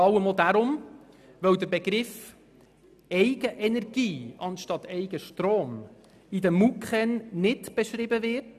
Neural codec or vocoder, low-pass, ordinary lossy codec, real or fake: none; 14.4 kHz; none; real